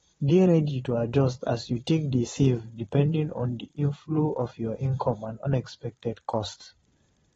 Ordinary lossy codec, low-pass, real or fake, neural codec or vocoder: AAC, 24 kbps; 9.9 kHz; fake; vocoder, 22.05 kHz, 80 mel bands, Vocos